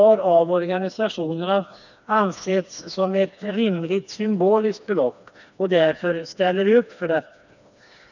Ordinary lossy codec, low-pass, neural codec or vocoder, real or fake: none; 7.2 kHz; codec, 16 kHz, 2 kbps, FreqCodec, smaller model; fake